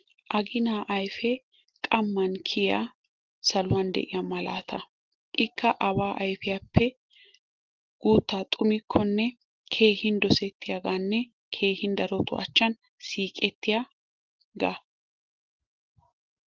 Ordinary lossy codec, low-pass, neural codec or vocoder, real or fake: Opus, 16 kbps; 7.2 kHz; none; real